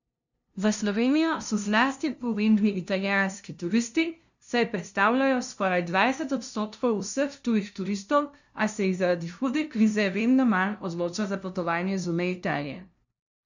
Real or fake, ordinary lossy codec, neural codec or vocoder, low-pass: fake; none; codec, 16 kHz, 0.5 kbps, FunCodec, trained on LibriTTS, 25 frames a second; 7.2 kHz